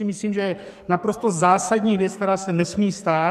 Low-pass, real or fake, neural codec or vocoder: 14.4 kHz; fake; codec, 44.1 kHz, 2.6 kbps, SNAC